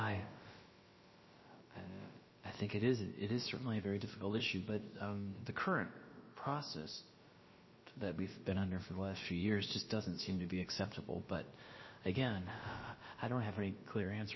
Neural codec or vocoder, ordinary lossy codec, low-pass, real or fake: codec, 16 kHz, about 1 kbps, DyCAST, with the encoder's durations; MP3, 24 kbps; 7.2 kHz; fake